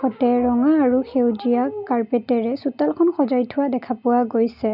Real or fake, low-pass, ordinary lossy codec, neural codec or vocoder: real; 5.4 kHz; none; none